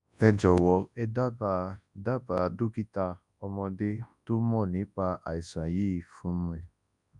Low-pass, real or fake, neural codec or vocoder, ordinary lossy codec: 10.8 kHz; fake; codec, 24 kHz, 0.9 kbps, WavTokenizer, large speech release; none